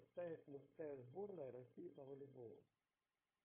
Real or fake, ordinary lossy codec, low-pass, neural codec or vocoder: fake; MP3, 16 kbps; 3.6 kHz; codec, 16 kHz, 0.9 kbps, LongCat-Audio-Codec